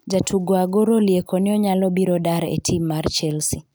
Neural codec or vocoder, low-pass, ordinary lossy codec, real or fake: none; none; none; real